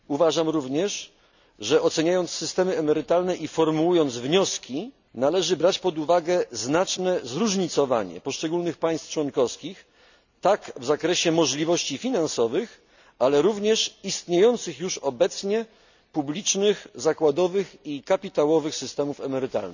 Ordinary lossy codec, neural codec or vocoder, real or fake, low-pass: none; none; real; 7.2 kHz